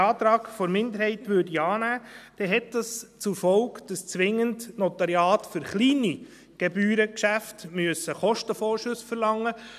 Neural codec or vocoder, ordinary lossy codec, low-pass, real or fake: none; none; 14.4 kHz; real